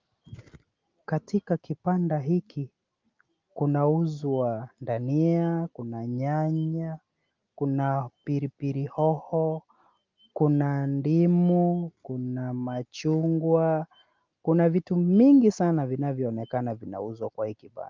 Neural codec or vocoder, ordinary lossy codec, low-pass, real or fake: none; Opus, 24 kbps; 7.2 kHz; real